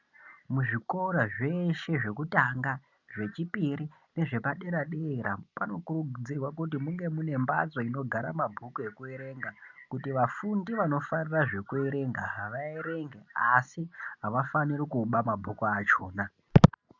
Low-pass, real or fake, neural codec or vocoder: 7.2 kHz; real; none